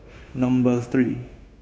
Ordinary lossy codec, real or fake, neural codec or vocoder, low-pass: none; fake; codec, 16 kHz, 0.9 kbps, LongCat-Audio-Codec; none